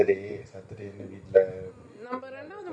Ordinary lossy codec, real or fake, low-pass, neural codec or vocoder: none; real; 9.9 kHz; none